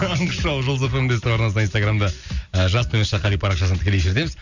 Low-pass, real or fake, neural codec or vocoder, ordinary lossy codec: 7.2 kHz; real; none; none